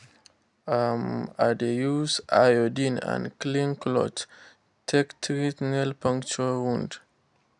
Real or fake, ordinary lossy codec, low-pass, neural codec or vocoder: real; none; 10.8 kHz; none